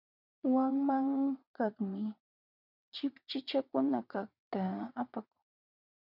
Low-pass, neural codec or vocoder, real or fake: 5.4 kHz; vocoder, 22.05 kHz, 80 mel bands, WaveNeXt; fake